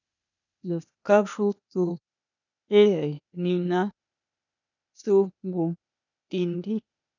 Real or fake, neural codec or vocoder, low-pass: fake; codec, 16 kHz, 0.8 kbps, ZipCodec; 7.2 kHz